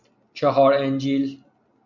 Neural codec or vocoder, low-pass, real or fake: none; 7.2 kHz; real